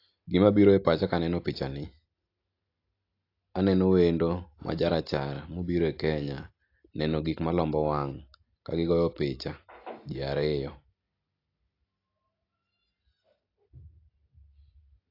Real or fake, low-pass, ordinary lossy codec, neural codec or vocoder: real; 5.4 kHz; AAC, 32 kbps; none